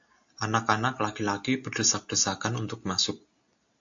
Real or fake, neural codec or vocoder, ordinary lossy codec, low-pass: real; none; AAC, 64 kbps; 7.2 kHz